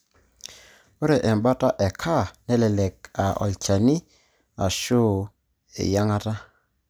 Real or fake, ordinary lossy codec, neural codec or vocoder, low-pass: real; none; none; none